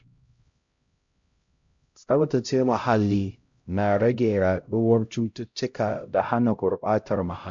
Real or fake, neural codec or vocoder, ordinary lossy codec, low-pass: fake; codec, 16 kHz, 0.5 kbps, X-Codec, HuBERT features, trained on LibriSpeech; MP3, 48 kbps; 7.2 kHz